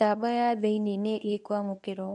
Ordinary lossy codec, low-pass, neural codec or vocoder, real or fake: none; 10.8 kHz; codec, 24 kHz, 0.9 kbps, WavTokenizer, medium speech release version 1; fake